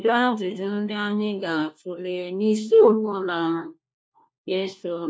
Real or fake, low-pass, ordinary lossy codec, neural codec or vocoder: fake; none; none; codec, 16 kHz, 1 kbps, FunCodec, trained on LibriTTS, 50 frames a second